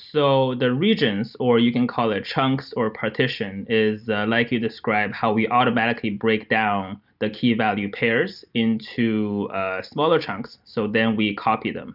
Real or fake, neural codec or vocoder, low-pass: real; none; 5.4 kHz